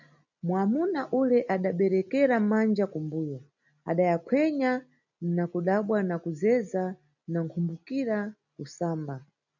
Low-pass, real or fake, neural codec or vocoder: 7.2 kHz; real; none